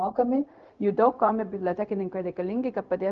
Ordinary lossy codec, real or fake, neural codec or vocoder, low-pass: Opus, 32 kbps; fake; codec, 16 kHz, 0.4 kbps, LongCat-Audio-Codec; 7.2 kHz